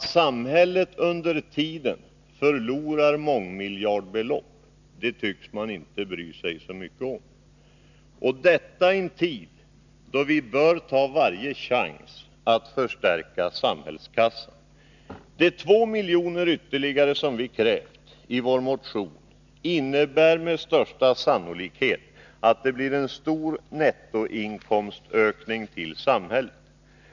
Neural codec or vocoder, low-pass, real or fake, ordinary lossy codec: none; 7.2 kHz; real; none